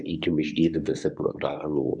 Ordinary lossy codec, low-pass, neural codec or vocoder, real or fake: MP3, 96 kbps; 9.9 kHz; codec, 24 kHz, 0.9 kbps, WavTokenizer, medium speech release version 1; fake